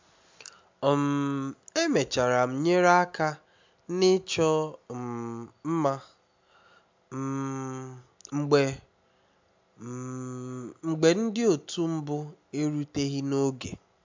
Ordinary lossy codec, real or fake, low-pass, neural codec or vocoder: MP3, 64 kbps; real; 7.2 kHz; none